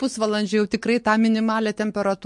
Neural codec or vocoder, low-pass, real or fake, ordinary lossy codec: none; 10.8 kHz; real; MP3, 48 kbps